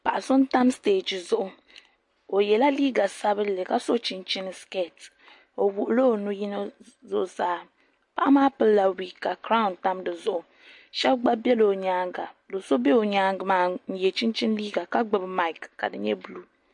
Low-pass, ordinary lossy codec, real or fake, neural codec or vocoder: 10.8 kHz; MP3, 48 kbps; real; none